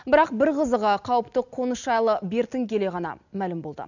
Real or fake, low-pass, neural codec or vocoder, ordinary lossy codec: real; 7.2 kHz; none; none